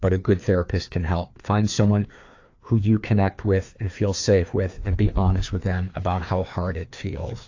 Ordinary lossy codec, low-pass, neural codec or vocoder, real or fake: AAC, 48 kbps; 7.2 kHz; codec, 16 kHz, 2 kbps, FreqCodec, larger model; fake